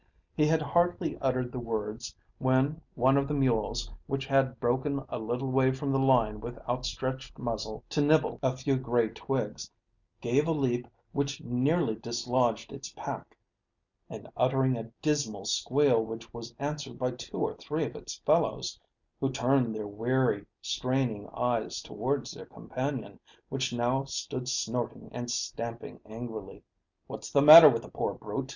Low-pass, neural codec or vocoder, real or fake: 7.2 kHz; none; real